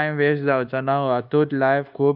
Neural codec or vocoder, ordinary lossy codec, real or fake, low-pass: codec, 24 kHz, 1.2 kbps, DualCodec; Opus, 24 kbps; fake; 5.4 kHz